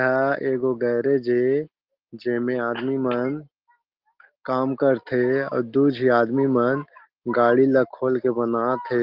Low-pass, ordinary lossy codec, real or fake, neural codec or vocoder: 5.4 kHz; Opus, 16 kbps; real; none